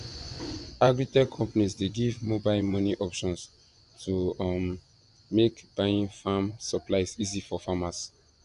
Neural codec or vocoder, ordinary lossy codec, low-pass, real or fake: vocoder, 24 kHz, 100 mel bands, Vocos; none; 10.8 kHz; fake